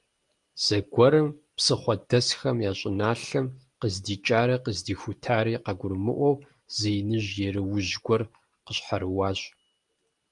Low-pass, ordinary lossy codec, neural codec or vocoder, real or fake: 10.8 kHz; Opus, 32 kbps; none; real